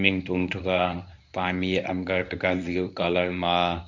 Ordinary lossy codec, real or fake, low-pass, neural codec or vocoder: none; fake; 7.2 kHz; codec, 24 kHz, 0.9 kbps, WavTokenizer, medium speech release version 1